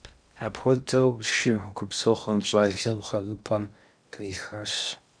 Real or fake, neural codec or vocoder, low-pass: fake; codec, 16 kHz in and 24 kHz out, 0.6 kbps, FocalCodec, streaming, 2048 codes; 9.9 kHz